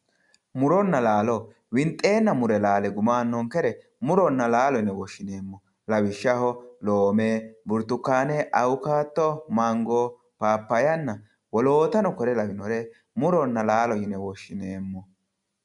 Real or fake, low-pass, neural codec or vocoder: real; 10.8 kHz; none